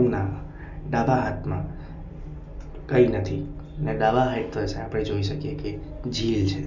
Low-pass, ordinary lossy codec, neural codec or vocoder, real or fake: 7.2 kHz; none; none; real